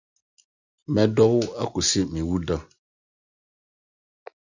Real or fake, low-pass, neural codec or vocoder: real; 7.2 kHz; none